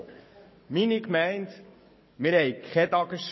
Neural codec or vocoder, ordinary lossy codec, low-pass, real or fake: codec, 44.1 kHz, 7.8 kbps, DAC; MP3, 24 kbps; 7.2 kHz; fake